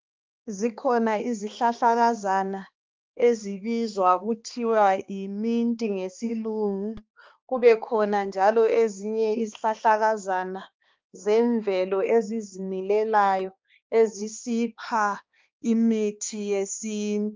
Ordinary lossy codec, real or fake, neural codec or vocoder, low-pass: Opus, 24 kbps; fake; codec, 16 kHz, 2 kbps, X-Codec, HuBERT features, trained on balanced general audio; 7.2 kHz